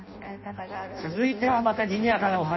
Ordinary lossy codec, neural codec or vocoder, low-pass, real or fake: MP3, 24 kbps; codec, 16 kHz in and 24 kHz out, 0.6 kbps, FireRedTTS-2 codec; 7.2 kHz; fake